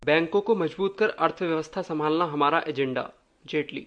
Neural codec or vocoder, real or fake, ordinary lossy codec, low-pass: none; real; MP3, 64 kbps; 9.9 kHz